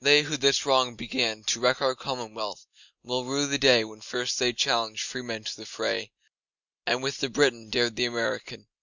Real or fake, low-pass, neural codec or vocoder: real; 7.2 kHz; none